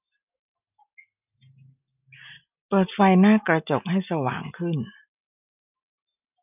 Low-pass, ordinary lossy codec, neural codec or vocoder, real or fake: 3.6 kHz; none; none; real